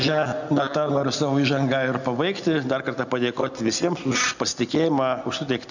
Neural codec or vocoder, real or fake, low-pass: none; real; 7.2 kHz